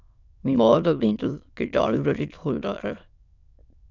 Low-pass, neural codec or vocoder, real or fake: 7.2 kHz; autoencoder, 22.05 kHz, a latent of 192 numbers a frame, VITS, trained on many speakers; fake